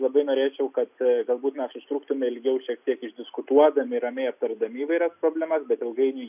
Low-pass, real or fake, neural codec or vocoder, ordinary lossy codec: 3.6 kHz; real; none; AAC, 32 kbps